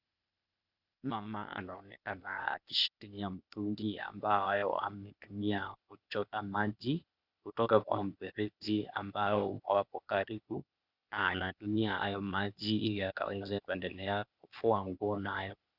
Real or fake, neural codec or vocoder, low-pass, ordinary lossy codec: fake; codec, 16 kHz, 0.8 kbps, ZipCodec; 5.4 kHz; Opus, 64 kbps